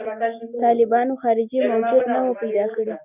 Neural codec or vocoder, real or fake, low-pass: none; real; 3.6 kHz